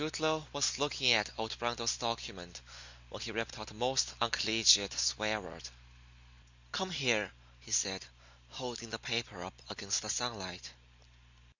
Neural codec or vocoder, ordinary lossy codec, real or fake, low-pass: none; Opus, 64 kbps; real; 7.2 kHz